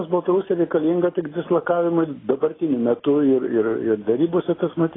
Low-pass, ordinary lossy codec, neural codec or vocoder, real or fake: 7.2 kHz; AAC, 16 kbps; none; real